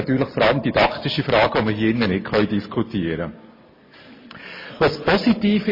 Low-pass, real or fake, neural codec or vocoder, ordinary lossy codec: 5.4 kHz; real; none; MP3, 24 kbps